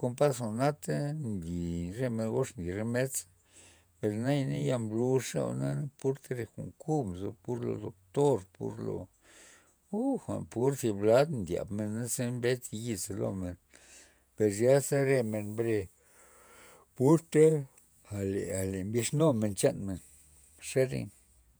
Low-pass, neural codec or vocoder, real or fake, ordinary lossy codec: none; vocoder, 48 kHz, 128 mel bands, Vocos; fake; none